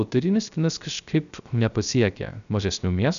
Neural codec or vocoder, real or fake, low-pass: codec, 16 kHz, 0.3 kbps, FocalCodec; fake; 7.2 kHz